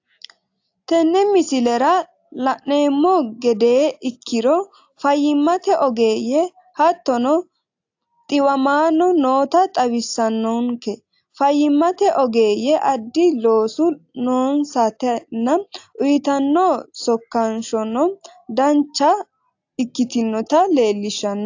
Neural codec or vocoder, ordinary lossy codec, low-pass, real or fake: none; AAC, 48 kbps; 7.2 kHz; real